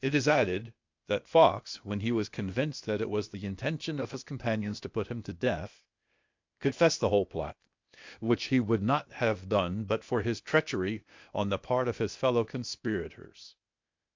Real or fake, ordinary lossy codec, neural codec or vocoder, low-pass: fake; MP3, 64 kbps; codec, 16 kHz, 0.8 kbps, ZipCodec; 7.2 kHz